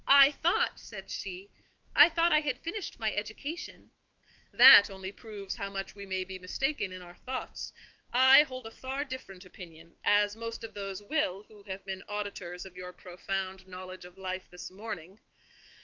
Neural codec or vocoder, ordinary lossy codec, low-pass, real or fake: autoencoder, 48 kHz, 128 numbers a frame, DAC-VAE, trained on Japanese speech; Opus, 24 kbps; 7.2 kHz; fake